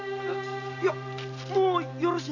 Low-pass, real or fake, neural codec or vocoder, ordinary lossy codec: 7.2 kHz; real; none; none